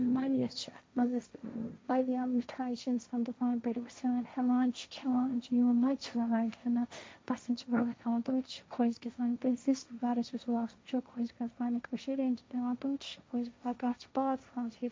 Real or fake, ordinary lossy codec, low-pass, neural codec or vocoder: fake; none; none; codec, 16 kHz, 1.1 kbps, Voila-Tokenizer